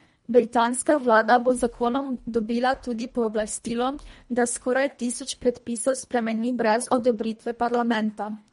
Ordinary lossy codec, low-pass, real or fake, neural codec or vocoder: MP3, 48 kbps; 10.8 kHz; fake; codec, 24 kHz, 1.5 kbps, HILCodec